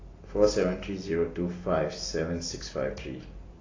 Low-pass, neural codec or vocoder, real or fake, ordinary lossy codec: 7.2 kHz; none; real; AAC, 32 kbps